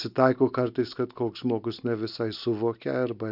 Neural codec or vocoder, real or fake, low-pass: none; real; 5.4 kHz